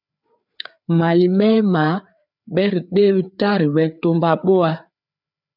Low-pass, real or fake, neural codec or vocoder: 5.4 kHz; fake; codec, 16 kHz, 4 kbps, FreqCodec, larger model